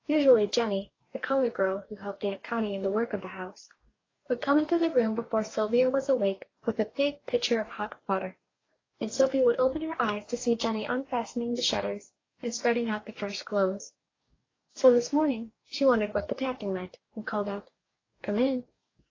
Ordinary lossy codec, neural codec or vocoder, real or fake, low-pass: AAC, 32 kbps; codec, 44.1 kHz, 2.6 kbps, DAC; fake; 7.2 kHz